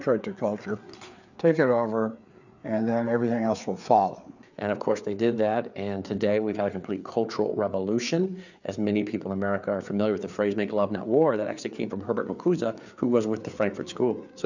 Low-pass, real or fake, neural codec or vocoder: 7.2 kHz; fake; codec, 16 kHz, 4 kbps, FreqCodec, larger model